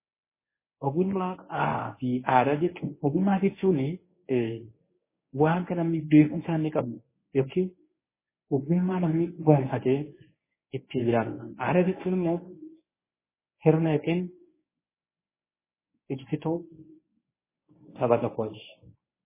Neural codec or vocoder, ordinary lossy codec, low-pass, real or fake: codec, 24 kHz, 0.9 kbps, WavTokenizer, medium speech release version 1; MP3, 16 kbps; 3.6 kHz; fake